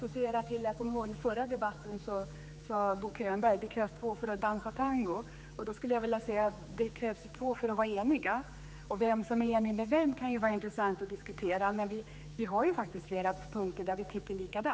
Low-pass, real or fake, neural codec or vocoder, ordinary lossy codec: none; fake; codec, 16 kHz, 4 kbps, X-Codec, HuBERT features, trained on general audio; none